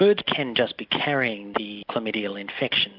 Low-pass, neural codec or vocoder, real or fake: 5.4 kHz; none; real